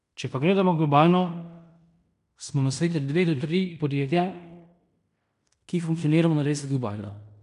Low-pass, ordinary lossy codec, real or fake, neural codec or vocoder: 10.8 kHz; MP3, 96 kbps; fake; codec, 16 kHz in and 24 kHz out, 0.9 kbps, LongCat-Audio-Codec, fine tuned four codebook decoder